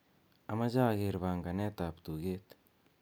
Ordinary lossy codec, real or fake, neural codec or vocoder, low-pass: none; real; none; none